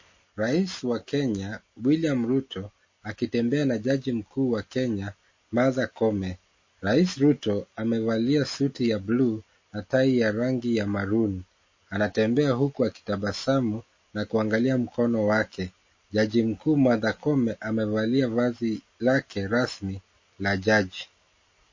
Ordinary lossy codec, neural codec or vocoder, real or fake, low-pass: MP3, 32 kbps; none; real; 7.2 kHz